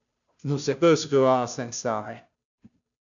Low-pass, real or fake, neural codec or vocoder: 7.2 kHz; fake; codec, 16 kHz, 0.5 kbps, FunCodec, trained on Chinese and English, 25 frames a second